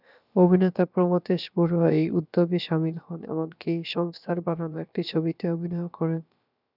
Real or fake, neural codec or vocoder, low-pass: fake; codec, 16 kHz, about 1 kbps, DyCAST, with the encoder's durations; 5.4 kHz